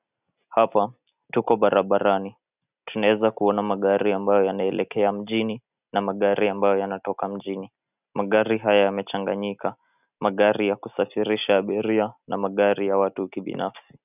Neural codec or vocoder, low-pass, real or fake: none; 3.6 kHz; real